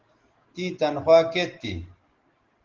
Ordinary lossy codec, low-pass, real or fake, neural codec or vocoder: Opus, 16 kbps; 7.2 kHz; real; none